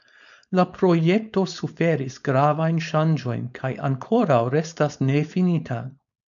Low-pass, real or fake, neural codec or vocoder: 7.2 kHz; fake; codec, 16 kHz, 4.8 kbps, FACodec